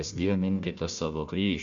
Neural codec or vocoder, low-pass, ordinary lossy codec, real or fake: codec, 16 kHz, 1 kbps, FunCodec, trained on Chinese and English, 50 frames a second; 7.2 kHz; Opus, 64 kbps; fake